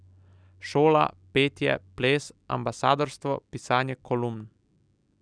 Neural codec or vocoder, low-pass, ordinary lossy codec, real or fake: none; 9.9 kHz; none; real